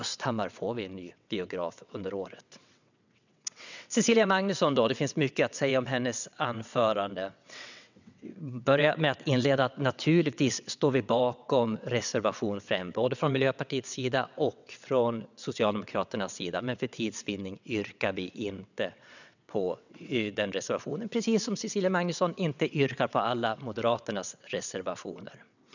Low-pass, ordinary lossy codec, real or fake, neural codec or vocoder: 7.2 kHz; none; fake; vocoder, 22.05 kHz, 80 mel bands, WaveNeXt